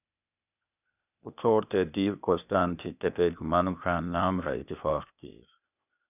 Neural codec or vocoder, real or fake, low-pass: codec, 16 kHz, 0.8 kbps, ZipCodec; fake; 3.6 kHz